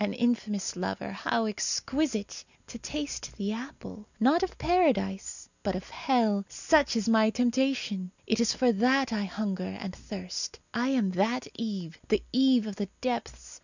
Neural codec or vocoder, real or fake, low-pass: none; real; 7.2 kHz